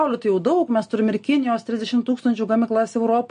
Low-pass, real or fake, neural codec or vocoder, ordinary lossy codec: 9.9 kHz; real; none; MP3, 48 kbps